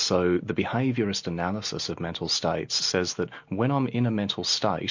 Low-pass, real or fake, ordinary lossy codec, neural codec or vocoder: 7.2 kHz; real; MP3, 48 kbps; none